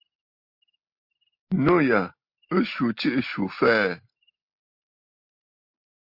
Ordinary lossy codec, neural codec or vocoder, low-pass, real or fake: MP3, 32 kbps; none; 5.4 kHz; real